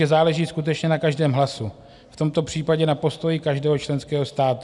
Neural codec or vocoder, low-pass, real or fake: none; 10.8 kHz; real